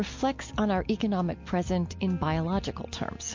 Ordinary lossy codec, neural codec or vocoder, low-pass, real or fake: MP3, 48 kbps; none; 7.2 kHz; real